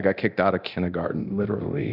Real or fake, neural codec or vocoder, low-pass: fake; codec, 24 kHz, 0.9 kbps, DualCodec; 5.4 kHz